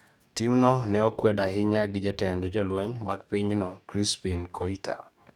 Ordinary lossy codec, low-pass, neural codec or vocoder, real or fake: none; 19.8 kHz; codec, 44.1 kHz, 2.6 kbps, DAC; fake